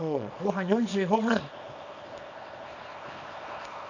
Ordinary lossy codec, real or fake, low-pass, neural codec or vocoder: none; fake; 7.2 kHz; codec, 24 kHz, 0.9 kbps, WavTokenizer, small release